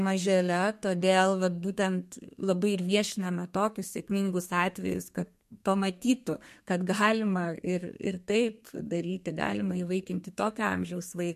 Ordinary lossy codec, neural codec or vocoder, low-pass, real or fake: MP3, 64 kbps; codec, 32 kHz, 1.9 kbps, SNAC; 14.4 kHz; fake